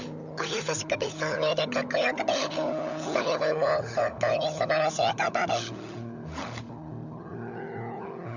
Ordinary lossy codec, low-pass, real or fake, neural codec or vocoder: none; 7.2 kHz; fake; codec, 16 kHz, 16 kbps, FunCodec, trained on LibriTTS, 50 frames a second